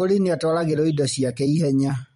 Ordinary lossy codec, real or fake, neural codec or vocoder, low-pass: MP3, 48 kbps; fake; vocoder, 44.1 kHz, 128 mel bands every 256 samples, BigVGAN v2; 19.8 kHz